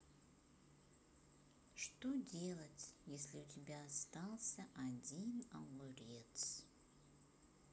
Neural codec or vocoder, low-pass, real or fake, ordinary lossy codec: none; none; real; none